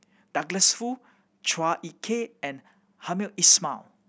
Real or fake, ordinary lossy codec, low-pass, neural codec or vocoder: real; none; none; none